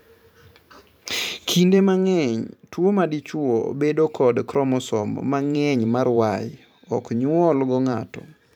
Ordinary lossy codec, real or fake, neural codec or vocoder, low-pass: none; fake; vocoder, 44.1 kHz, 128 mel bands every 512 samples, BigVGAN v2; 19.8 kHz